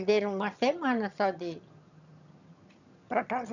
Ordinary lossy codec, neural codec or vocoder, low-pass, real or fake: none; vocoder, 22.05 kHz, 80 mel bands, HiFi-GAN; 7.2 kHz; fake